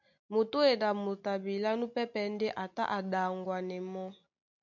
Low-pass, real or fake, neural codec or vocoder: 7.2 kHz; real; none